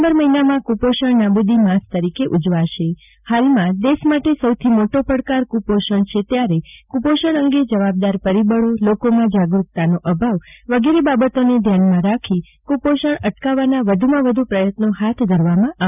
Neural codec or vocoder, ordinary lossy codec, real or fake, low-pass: none; none; real; 3.6 kHz